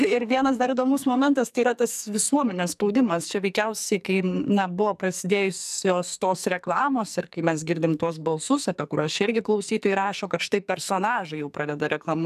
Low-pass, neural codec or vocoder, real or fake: 14.4 kHz; codec, 44.1 kHz, 2.6 kbps, SNAC; fake